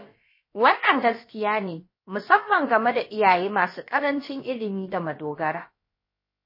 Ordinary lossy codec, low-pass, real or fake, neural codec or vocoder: MP3, 24 kbps; 5.4 kHz; fake; codec, 16 kHz, about 1 kbps, DyCAST, with the encoder's durations